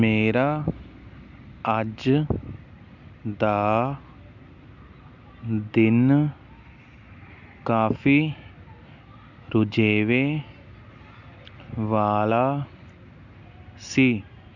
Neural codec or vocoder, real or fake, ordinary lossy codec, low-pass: none; real; none; 7.2 kHz